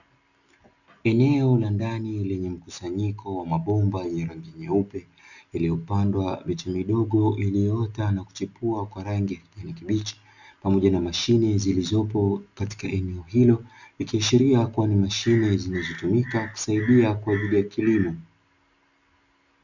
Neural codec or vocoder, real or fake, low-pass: none; real; 7.2 kHz